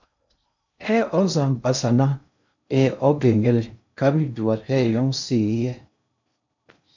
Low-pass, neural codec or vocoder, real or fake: 7.2 kHz; codec, 16 kHz in and 24 kHz out, 0.6 kbps, FocalCodec, streaming, 2048 codes; fake